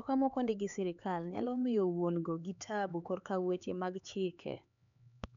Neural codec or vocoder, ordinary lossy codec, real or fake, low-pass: codec, 16 kHz, 4 kbps, X-Codec, HuBERT features, trained on LibriSpeech; none; fake; 7.2 kHz